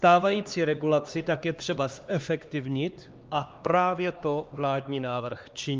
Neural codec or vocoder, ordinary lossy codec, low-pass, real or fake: codec, 16 kHz, 2 kbps, X-Codec, HuBERT features, trained on LibriSpeech; Opus, 24 kbps; 7.2 kHz; fake